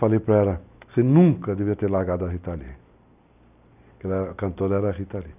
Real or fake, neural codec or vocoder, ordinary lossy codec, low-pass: real; none; none; 3.6 kHz